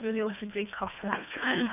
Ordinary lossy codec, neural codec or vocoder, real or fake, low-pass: none; codec, 24 kHz, 1.5 kbps, HILCodec; fake; 3.6 kHz